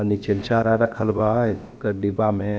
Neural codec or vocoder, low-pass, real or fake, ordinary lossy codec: codec, 16 kHz, about 1 kbps, DyCAST, with the encoder's durations; none; fake; none